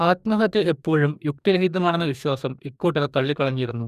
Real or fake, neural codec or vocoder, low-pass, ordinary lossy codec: fake; codec, 44.1 kHz, 2.6 kbps, DAC; 14.4 kHz; none